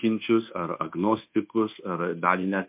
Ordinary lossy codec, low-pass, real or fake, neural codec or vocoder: MP3, 32 kbps; 3.6 kHz; fake; codec, 24 kHz, 1.2 kbps, DualCodec